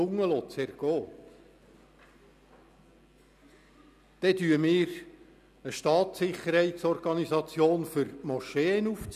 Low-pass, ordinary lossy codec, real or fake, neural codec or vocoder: 14.4 kHz; none; real; none